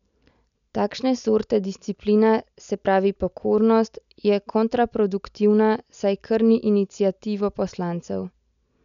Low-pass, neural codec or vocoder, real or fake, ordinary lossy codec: 7.2 kHz; none; real; none